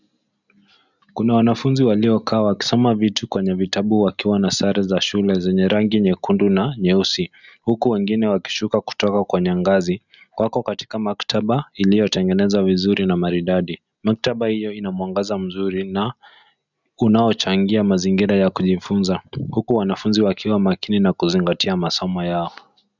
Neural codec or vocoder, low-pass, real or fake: none; 7.2 kHz; real